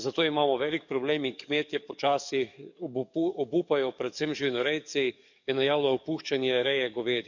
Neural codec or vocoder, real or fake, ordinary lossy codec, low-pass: codec, 44.1 kHz, 7.8 kbps, DAC; fake; none; 7.2 kHz